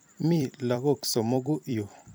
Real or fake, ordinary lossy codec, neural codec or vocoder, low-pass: fake; none; vocoder, 44.1 kHz, 128 mel bands every 256 samples, BigVGAN v2; none